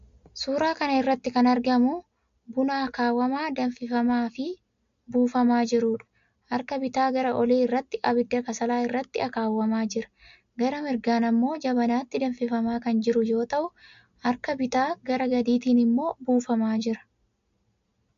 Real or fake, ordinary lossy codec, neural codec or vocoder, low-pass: real; MP3, 48 kbps; none; 7.2 kHz